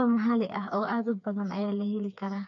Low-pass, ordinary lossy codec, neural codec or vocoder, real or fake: 7.2 kHz; none; codec, 16 kHz, 4 kbps, FreqCodec, smaller model; fake